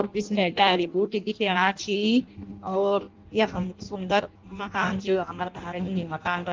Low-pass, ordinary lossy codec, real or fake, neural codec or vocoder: 7.2 kHz; Opus, 24 kbps; fake; codec, 16 kHz in and 24 kHz out, 0.6 kbps, FireRedTTS-2 codec